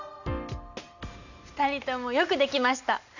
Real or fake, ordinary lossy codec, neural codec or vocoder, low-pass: real; none; none; 7.2 kHz